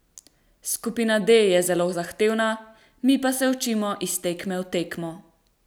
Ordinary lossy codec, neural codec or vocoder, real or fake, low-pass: none; none; real; none